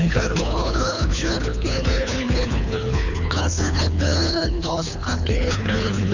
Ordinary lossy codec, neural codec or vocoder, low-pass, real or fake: none; codec, 24 kHz, 3 kbps, HILCodec; 7.2 kHz; fake